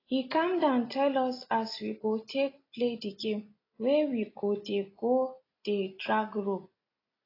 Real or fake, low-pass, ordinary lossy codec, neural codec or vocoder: real; 5.4 kHz; AAC, 24 kbps; none